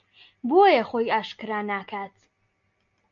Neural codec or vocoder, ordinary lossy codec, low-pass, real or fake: none; MP3, 64 kbps; 7.2 kHz; real